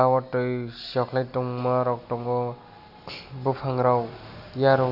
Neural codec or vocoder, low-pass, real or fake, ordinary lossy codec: none; 5.4 kHz; real; AAC, 48 kbps